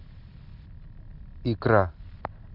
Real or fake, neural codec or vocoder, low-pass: real; none; 5.4 kHz